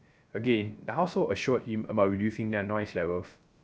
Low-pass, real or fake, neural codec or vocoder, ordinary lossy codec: none; fake; codec, 16 kHz, 0.3 kbps, FocalCodec; none